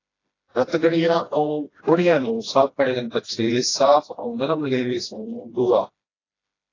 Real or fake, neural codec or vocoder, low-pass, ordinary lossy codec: fake; codec, 16 kHz, 1 kbps, FreqCodec, smaller model; 7.2 kHz; AAC, 32 kbps